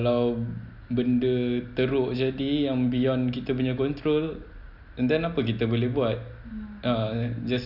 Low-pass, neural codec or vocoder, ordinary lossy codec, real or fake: 5.4 kHz; none; none; real